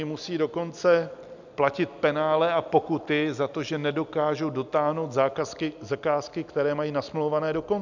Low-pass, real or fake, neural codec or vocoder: 7.2 kHz; real; none